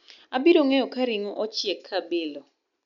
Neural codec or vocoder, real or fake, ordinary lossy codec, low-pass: none; real; none; 7.2 kHz